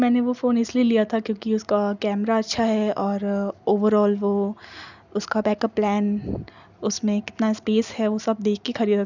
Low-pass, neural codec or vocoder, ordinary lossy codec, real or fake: 7.2 kHz; none; none; real